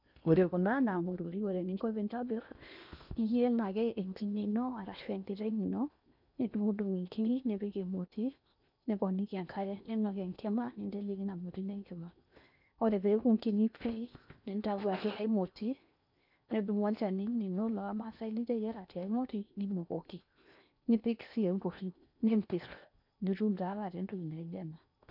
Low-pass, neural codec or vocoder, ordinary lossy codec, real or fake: 5.4 kHz; codec, 16 kHz in and 24 kHz out, 0.8 kbps, FocalCodec, streaming, 65536 codes; none; fake